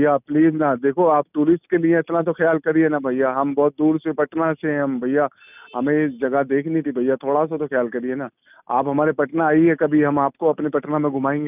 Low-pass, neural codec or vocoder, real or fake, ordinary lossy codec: 3.6 kHz; none; real; none